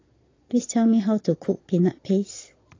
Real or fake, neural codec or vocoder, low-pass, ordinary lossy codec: fake; vocoder, 22.05 kHz, 80 mel bands, Vocos; 7.2 kHz; AAC, 32 kbps